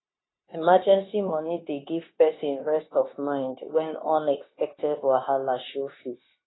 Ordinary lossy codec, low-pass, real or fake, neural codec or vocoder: AAC, 16 kbps; 7.2 kHz; fake; codec, 16 kHz, 0.9 kbps, LongCat-Audio-Codec